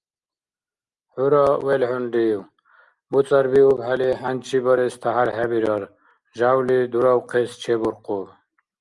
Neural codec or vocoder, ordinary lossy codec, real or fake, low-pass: none; Opus, 32 kbps; real; 10.8 kHz